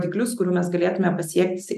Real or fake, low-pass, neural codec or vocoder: real; 14.4 kHz; none